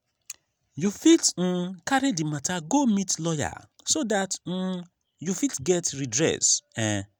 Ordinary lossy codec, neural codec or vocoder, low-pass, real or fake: none; none; none; real